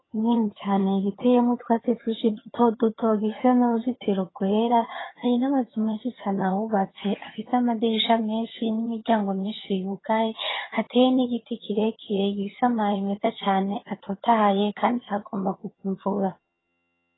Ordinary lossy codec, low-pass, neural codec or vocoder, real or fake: AAC, 16 kbps; 7.2 kHz; vocoder, 22.05 kHz, 80 mel bands, HiFi-GAN; fake